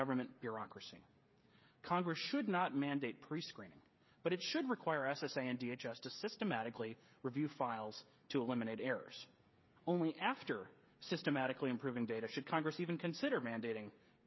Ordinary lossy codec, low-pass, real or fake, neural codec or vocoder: MP3, 24 kbps; 7.2 kHz; fake; codec, 16 kHz, 16 kbps, FreqCodec, smaller model